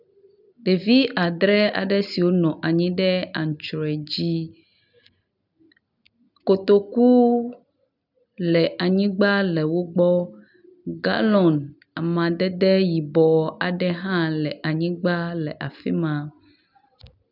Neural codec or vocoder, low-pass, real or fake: none; 5.4 kHz; real